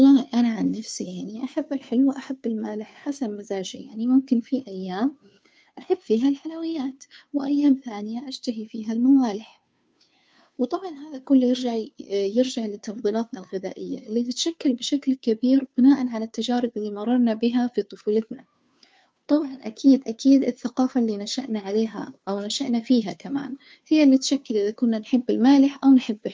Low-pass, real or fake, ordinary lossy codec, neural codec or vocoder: none; fake; none; codec, 16 kHz, 2 kbps, FunCodec, trained on Chinese and English, 25 frames a second